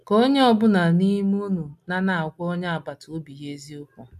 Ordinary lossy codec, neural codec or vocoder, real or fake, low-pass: none; none; real; 14.4 kHz